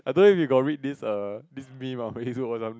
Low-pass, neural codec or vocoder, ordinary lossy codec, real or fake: none; none; none; real